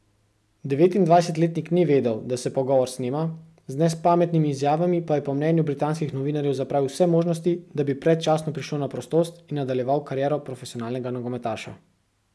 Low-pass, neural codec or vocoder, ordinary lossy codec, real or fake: none; none; none; real